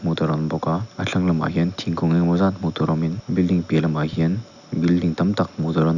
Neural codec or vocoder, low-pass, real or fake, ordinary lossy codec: none; 7.2 kHz; real; none